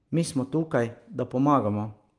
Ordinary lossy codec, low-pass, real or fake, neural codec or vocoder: Opus, 24 kbps; 10.8 kHz; real; none